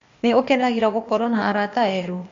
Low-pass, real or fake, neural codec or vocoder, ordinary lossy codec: 7.2 kHz; fake; codec, 16 kHz, 0.8 kbps, ZipCodec; MP3, 48 kbps